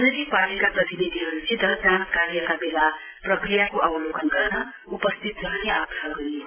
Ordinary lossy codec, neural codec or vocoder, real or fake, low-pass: AAC, 24 kbps; none; real; 3.6 kHz